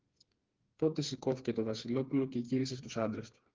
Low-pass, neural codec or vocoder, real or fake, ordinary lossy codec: 7.2 kHz; codec, 16 kHz, 4 kbps, FreqCodec, smaller model; fake; Opus, 16 kbps